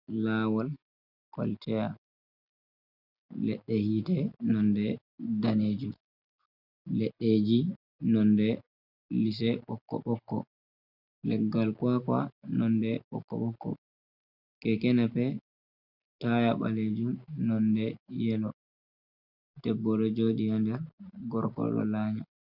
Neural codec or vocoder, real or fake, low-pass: none; real; 5.4 kHz